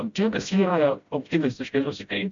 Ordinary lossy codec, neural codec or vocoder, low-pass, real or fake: MP3, 64 kbps; codec, 16 kHz, 0.5 kbps, FreqCodec, smaller model; 7.2 kHz; fake